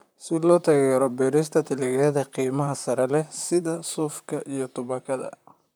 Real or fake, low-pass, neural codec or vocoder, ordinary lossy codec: fake; none; vocoder, 44.1 kHz, 128 mel bands, Pupu-Vocoder; none